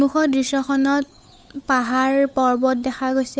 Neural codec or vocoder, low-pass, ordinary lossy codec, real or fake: codec, 16 kHz, 8 kbps, FunCodec, trained on Chinese and English, 25 frames a second; none; none; fake